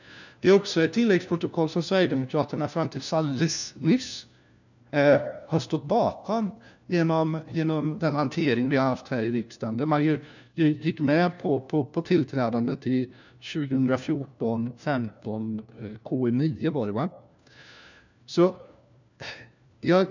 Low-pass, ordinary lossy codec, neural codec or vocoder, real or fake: 7.2 kHz; none; codec, 16 kHz, 1 kbps, FunCodec, trained on LibriTTS, 50 frames a second; fake